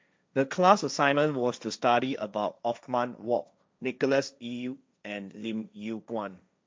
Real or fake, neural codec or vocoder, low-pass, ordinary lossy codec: fake; codec, 16 kHz, 1.1 kbps, Voila-Tokenizer; none; none